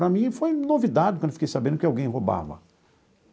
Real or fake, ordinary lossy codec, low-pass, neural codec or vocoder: real; none; none; none